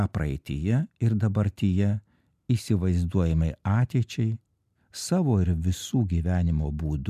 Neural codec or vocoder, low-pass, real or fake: none; 14.4 kHz; real